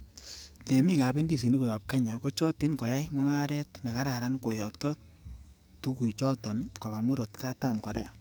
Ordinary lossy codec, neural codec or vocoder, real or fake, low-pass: none; codec, 44.1 kHz, 2.6 kbps, SNAC; fake; none